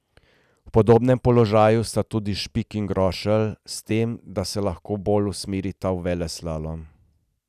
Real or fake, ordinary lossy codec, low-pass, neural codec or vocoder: real; none; 14.4 kHz; none